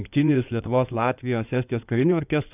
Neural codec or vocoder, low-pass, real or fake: codec, 16 kHz in and 24 kHz out, 2.2 kbps, FireRedTTS-2 codec; 3.6 kHz; fake